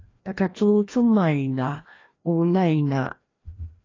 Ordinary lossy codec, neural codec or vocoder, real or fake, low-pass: AAC, 32 kbps; codec, 16 kHz, 1 kbps, FreqCodec, larger model; fake; 7.2 kHz